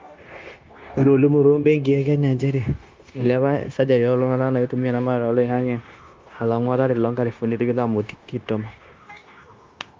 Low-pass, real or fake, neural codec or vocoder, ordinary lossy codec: 7.2 kHz; fake; codec, 16 kHz, 0.9 kbps, LongCat-Audio-Codec; Opus, 24 kbps